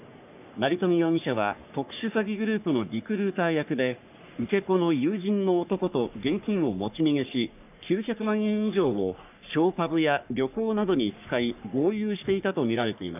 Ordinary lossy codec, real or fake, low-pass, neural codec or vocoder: none; fake; 3.6 kHz; codec, 44.1 kHz, 3.4 kbps, Pupu-Codec